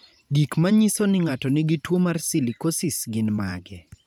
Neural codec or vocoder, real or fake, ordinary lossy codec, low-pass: vocoder, 44.1 kHz, 128 mel bands every 512 samples, BigVGAN v2; fake; none; none